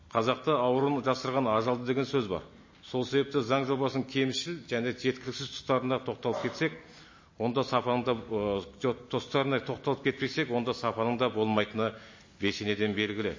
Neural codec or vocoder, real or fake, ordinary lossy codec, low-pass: none; real; MP3, 32 kbps; 7.2 kHz